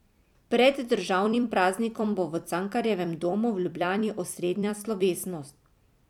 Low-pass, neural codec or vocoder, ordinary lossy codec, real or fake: 19.8 kHz; vocoder, 44.1 kHz, 128 mel bands every 256 samples, BigVGAN v2; none; fake